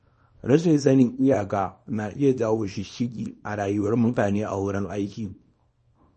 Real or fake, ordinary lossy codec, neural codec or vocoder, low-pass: fake; MP3, 32 kbps; codec, 24 kHz, 0.9 kbps, WavTokenizer, small release; 10.8 kHz